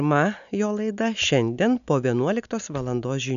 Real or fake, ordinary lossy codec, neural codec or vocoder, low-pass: real; MP3, 96 kbps; none; 7.2 kHz